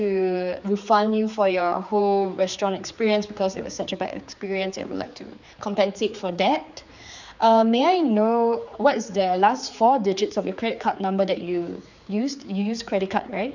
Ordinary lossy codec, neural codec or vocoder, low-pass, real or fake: none; codec, 16 kHz, 4 kbps, X-Codec, HuBERT features, trained on general audio; 7.2 kHz; fake